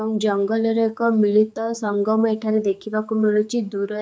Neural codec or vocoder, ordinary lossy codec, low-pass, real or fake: codec, 16 kHz, 4 kbps, X-Codec, HuBERT features, trained on general audio; none; none; fake